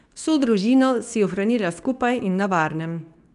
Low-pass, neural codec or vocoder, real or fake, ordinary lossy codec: 10.8 kHz; codec, 24 kHz, 0.9 kbps, WavTokenizer, medium speech release version 2; fake; none